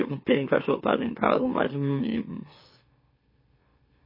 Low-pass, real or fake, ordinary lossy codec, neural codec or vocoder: 5.4 kHz; fake; MP3, 24 kbps; autoencoder, 44.1 kHz, a latent of 192 numbers a frame, MeloTTS